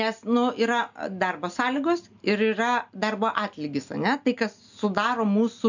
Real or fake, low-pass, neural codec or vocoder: real; 7.2 kHz; none